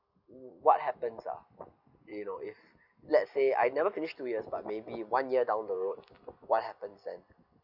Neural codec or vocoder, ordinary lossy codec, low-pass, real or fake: none; none; 5.4 kHz; real